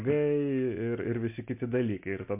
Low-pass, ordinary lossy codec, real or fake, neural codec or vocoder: 3.6 kHz; MP3, 32 kbps; real; none